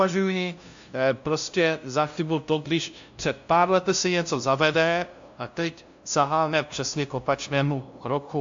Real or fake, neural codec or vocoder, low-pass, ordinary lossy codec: fake; codec, 16 kHz, 0.5 kbps, FunCodec, trained on LibriTTS, 25 frames a second; 7.2 kHz; AAC, 64 kbps